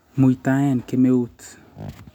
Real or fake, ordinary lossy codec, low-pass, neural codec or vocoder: real; none; 19.8 kHz; none